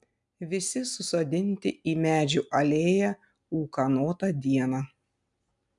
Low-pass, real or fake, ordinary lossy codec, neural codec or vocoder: 10.8 kHz; real; MP3, 96 kbps; none